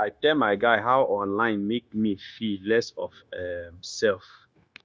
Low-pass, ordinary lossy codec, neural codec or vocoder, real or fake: none; none; codec, 16 kHz, 0.9 kbps, LongCat-Audio-Codec; fake